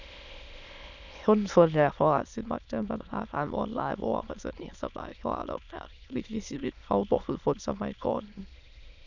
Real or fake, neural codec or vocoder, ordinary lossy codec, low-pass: fake; autoencoder, 22.05 kHz, a latent of 192 numbers a frame, VITS, trained on many speakers; none; 7.2 kHz